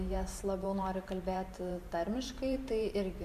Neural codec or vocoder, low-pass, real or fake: vocoder, 44.1 kHz, 128 mel bands every 512 samples, BigVGAN v2; 14.4 kHz; fake